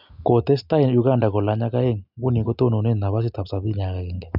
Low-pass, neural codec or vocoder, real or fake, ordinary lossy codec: 5.4 kHz; none; real; none